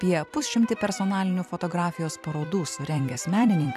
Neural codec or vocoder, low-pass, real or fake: vocoder, 44.1 kHz, 128 mel bands every 256 samples, BigVGAN v2; 14.4 kHz; fake